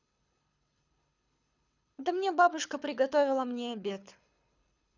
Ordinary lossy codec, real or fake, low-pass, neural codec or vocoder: none; fake; 7.2 kHz; codec, 24 kHz, 6 kbps, HILCodec